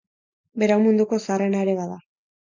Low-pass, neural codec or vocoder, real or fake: 7.2 kHz; none; real